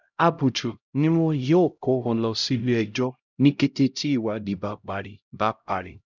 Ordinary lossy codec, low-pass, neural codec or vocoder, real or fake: none; 7.2 kHz; codec, 16 kHz, 0.5 kbps, X-Codec, HuBERT features, trained on LibriSpeech; fake